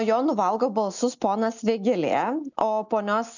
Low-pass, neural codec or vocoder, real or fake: 7.2 kHz; none; real